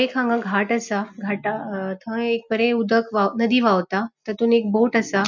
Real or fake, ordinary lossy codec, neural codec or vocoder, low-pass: real; none; none; 7.2 kHz